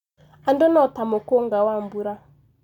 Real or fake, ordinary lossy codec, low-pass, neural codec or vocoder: real; none; 19.8 kHz; none